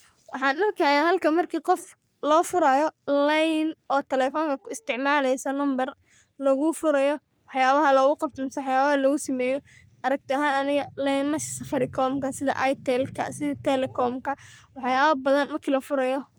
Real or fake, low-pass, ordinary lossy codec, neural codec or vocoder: fake; none; none; codec, 44.1 kHz, 3.4 kbps, Pupu-Codec